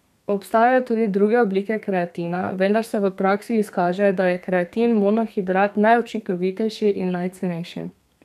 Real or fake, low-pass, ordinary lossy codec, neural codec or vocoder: fake; 14.4 kHz; none; codec, 32 kHz, 1.9 kbps, SNAC